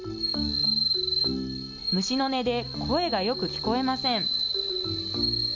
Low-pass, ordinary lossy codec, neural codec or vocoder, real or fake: 7.2 kHz; MP3, 64 kbps; none; real